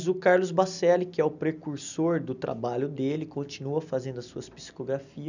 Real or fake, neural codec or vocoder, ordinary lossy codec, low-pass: real; none; none; 7.2 kHz